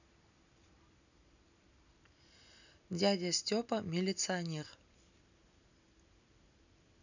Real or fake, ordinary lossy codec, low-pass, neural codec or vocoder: real; none; 7.2 kHz; none